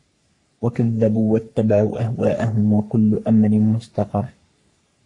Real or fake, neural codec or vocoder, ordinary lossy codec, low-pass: fake; codec, 44.1 kHz, 3.4 kbps, Pupu-Codec; AAC, 48 kbps; 10.8 kHz